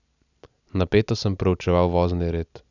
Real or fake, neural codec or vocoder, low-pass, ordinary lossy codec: real; none; 7.2 kHz; none